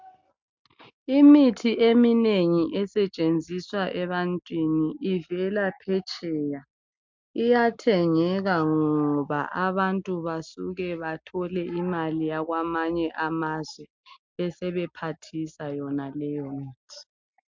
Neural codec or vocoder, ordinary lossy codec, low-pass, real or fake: none; MP3, 64 kbps; 7.2 kHz; real